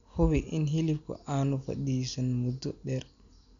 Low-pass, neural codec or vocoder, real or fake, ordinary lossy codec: 7.2 kHz; none; real; none